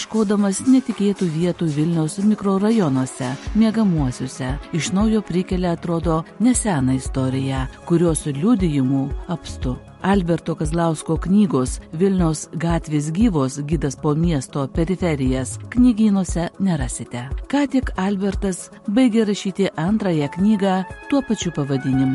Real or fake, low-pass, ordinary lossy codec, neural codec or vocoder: real; 14.4 kHz; MP3, 48 kbps; none